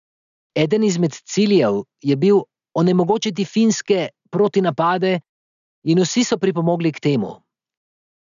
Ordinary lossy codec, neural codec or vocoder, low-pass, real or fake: none; none; 7.2 kHz; real